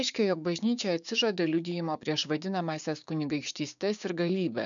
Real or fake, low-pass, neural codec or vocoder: fake; 7.2 kHz; codec, 16 kHz, 6 kbps, DAC